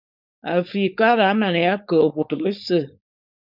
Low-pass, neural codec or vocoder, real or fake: 5.4 kHz; codec, 16 kHz, 4.8 kbps, FACodec; fake